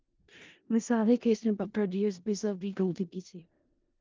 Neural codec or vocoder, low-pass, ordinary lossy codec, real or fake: codec, 16 kHz in and 24 kHz out, 0.4 kbps, LongCat-Audio-Codec, four codebook decoder; 7.2 kHz; Opus, 32 kbps; fake